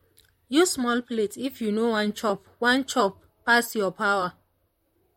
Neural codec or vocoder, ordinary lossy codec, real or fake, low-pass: vocoder, 44.1 kHz, 128 mel bands, Pupu-Vocoder; MP3, 64 kbps; fake; 19.8 kHz